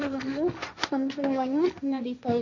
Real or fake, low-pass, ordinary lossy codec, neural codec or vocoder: fake; 7.2 kHz; none; codec, 16 kHz, 1.1 kbps, Voila-Tokenizer